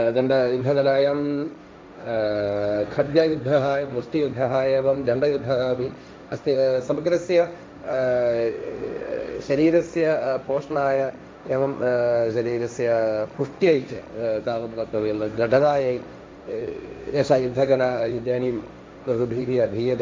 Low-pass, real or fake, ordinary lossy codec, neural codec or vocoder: none; fake; none; codec, 16 kHz, 1.1 kbps, Voila-Tokenizer